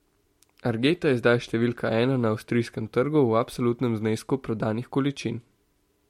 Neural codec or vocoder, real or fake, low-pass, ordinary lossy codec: none; real; 19.8 kHz; MP3, 64 kbps